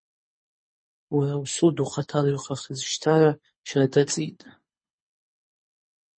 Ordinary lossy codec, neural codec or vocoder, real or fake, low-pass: MP3, 32 kbps; codec, 24 kHz, 6 kbps, HILCodec; fake; 9.9 kHz